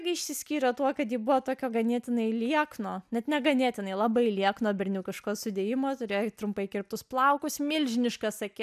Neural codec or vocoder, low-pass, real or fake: none; 14.4 kHz; real